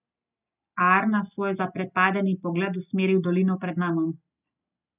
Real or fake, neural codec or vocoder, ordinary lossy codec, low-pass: real; none; none; 3.6 kHz